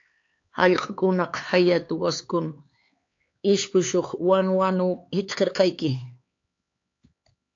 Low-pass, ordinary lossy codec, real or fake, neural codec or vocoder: 7.2 kHz; AAC, 48 kbps; fake; codec, 16 kHz, 4 kbps, X-Codec, HuBERT features, trained on LibriSpeech